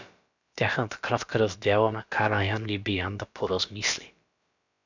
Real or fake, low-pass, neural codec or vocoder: fake; 7.2 kHz; codec, 16 kHz, about 1 kbps, DyCAST, with the encoder's durations